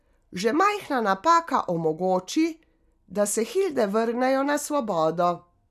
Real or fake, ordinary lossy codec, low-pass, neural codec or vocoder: real; none; 14.4 kHz; none